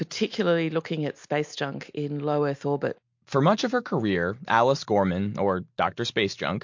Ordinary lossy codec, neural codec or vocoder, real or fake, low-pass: MP3, 48 kbps; none; real; 7.2 kHz